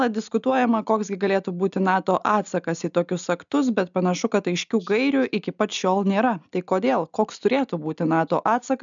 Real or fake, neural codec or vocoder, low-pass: real; none; 7.2 kHz